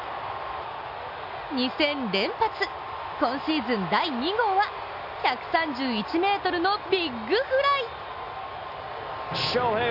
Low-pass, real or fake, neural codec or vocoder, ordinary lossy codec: 5.4 kHz; real; none; AAC, 48 kbps